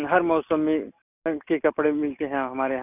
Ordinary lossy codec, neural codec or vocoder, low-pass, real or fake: none; none; 3.6 kHz; real